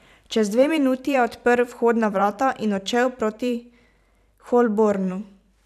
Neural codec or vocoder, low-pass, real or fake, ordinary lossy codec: vocoder, 44.1 kHz, 128 mel bands every 512 samples, BigVGAN v2; 14.4 kHz; fake; none